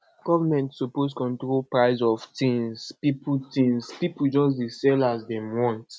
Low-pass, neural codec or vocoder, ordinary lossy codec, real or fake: none; none; none; real